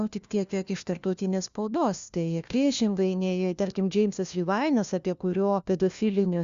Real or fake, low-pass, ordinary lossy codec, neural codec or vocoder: fake; 7.2 kHz; Opus, 64 kbps; codec, 16 kHz, 1 kbps, FunCodec, trained on LibriTTS, 50 frames a second